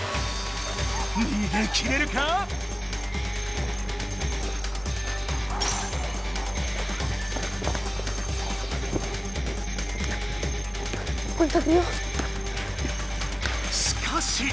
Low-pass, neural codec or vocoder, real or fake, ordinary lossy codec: none; none; real; none